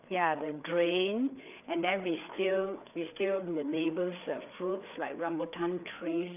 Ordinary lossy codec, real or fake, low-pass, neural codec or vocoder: none; fake; 3.6 kHz; codec, 16 kHz, 4 kbps, FreqCodec, larger model